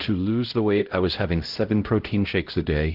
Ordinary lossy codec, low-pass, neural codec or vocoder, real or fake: Opus, 16 kbps; 5.4 kHz; codec, 16 kHz, 0.5 kbps, X-Codec, HuBERT features, trained on LibriSpeech; fake